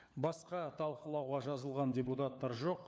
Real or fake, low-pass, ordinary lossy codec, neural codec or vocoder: fake; none; none; codec, 16 kHz, 4 kbps, FunCodec, trained on LibriTTS, 50 frames a second